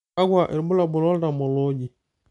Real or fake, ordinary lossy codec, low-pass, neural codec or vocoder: real; none; 10.8 kHz; none